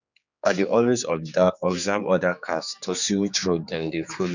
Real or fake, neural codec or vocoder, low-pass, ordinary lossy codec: fake; codec, 16 kHz, 4 kbps, X-Codec, HuBERT features, trained on general audio; 7.2 kHz; none